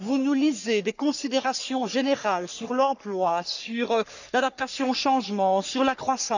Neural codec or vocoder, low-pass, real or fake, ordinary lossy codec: codec, 44.1 kHz, 3.4 kbps, Pupu-Codec; 7.2 kHz; fake; none